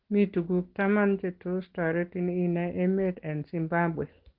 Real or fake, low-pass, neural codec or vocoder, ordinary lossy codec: real; 5.4 kHz; none; Opus, 16 kbps